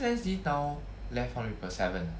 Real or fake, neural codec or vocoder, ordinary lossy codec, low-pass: real; none; none; none